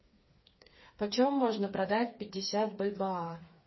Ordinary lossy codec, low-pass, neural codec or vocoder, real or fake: MP3, 24 kbps; 7.2 kHz; codec, 16 kHz, 4 kbps, FreqCodec, smaller model; fake